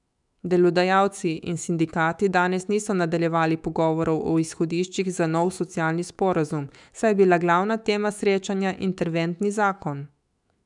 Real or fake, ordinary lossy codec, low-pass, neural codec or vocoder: fake; none; 10.8 kHz; autoencoder, 48 kHz, 128 numbers a frame, DAC-VAE, trained on Japanese speech